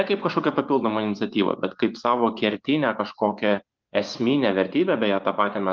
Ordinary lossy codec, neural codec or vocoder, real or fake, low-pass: Opus, 32 kbps; vocoder, 24 kHz, 100 mel bands, Vocos; fake; 7.2 kHz